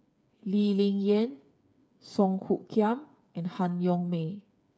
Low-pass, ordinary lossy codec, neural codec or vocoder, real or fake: none; none; codec, 16 kHz, 8 kbps, FreqCodec, smaller model; fake